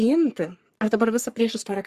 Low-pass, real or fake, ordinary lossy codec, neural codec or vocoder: 14.4 kHz; fake; Opus, 64 kbps; codec, 44.1 kHz, 3.4 kbps, Pupu-Codec